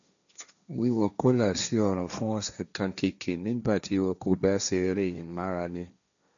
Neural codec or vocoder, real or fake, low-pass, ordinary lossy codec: codec, 16 kHz, 1.1 kbps, Voila-Tokenizer; fake; 7.2 kHz; none